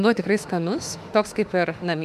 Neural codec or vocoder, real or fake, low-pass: autoencoder, 48 kHz, 32 numbers a frame, DAC-VAE, trained on Japanese speech; fake; 14.4 kHz